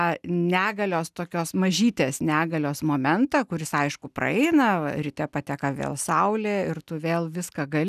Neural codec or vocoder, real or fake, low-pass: none; real; 14.4 kHz